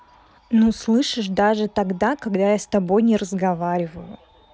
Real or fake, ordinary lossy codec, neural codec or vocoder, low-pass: real; none; none; none